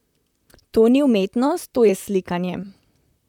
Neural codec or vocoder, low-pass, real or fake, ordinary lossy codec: vocoder, 44.1 kHz, 128 mel bands, Pupu-Vocoder; 19.8 kHz; fake; none